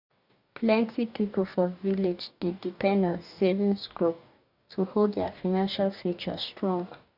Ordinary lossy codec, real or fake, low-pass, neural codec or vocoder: none; fake; 5.4 kHz; codec, 44.1 kHz, 2.6 kbps, DAC